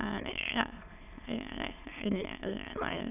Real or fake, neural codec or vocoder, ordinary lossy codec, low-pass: fake; autoencoder, 22.05 kHz, a latent of 192 numbers a frame, VITS, trained on many speakers; none; 3.6 kHz